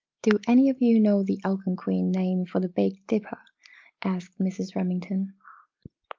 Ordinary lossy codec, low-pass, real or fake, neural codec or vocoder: Opus, 24 kbps; 7.2 kHz; real; none